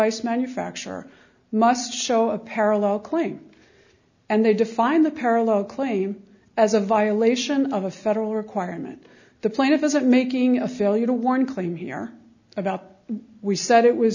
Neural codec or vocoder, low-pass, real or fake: none; 7.2 kHz; real